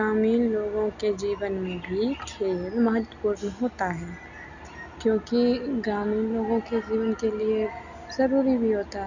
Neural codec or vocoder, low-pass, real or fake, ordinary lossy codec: none; 7.2 kHz; real; none